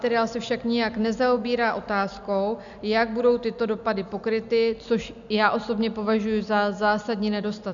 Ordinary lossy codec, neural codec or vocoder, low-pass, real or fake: MP3, 96 kbps; none; 7.2 kHz; real